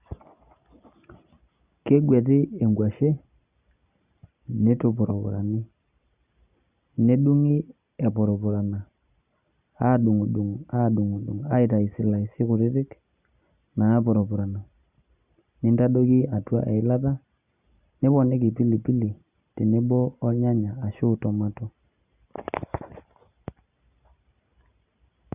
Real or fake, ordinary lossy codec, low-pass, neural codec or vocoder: real; Opus, 64 kbps; 3.6 kHz; none